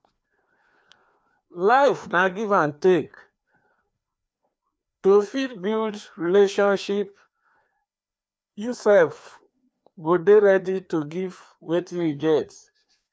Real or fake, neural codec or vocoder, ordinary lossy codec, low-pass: fake; codec, 16 kHz, 2 kbps, FreqCodec, larger model; none; none